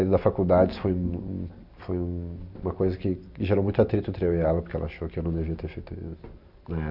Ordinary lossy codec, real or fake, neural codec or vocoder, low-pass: AAC, 48 kbps; real; none; 5.4 kHz